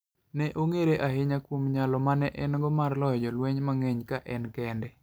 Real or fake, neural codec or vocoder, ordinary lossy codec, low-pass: real; none; none; none